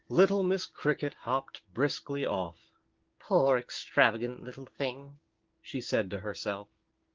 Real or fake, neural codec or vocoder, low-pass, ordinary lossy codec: fake; codec, 16 kHz, 6 kbps, DAC; 7.2 kHz; Opus, 16 kbps